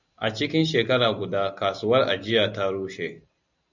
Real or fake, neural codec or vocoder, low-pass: real; none; 7.2 kHz